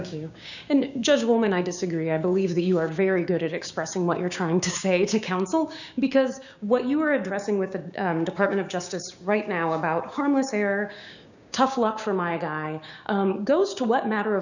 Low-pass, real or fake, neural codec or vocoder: 7.2 kHz; fake; codec, 16 kHz, 6 kbps, DAC